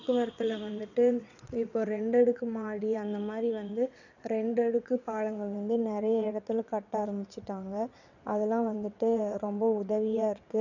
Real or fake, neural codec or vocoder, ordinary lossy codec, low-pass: fake; vocoder, 22.05 kHz, 80 mel bands, WaveNeXt; none; 7.2 kHz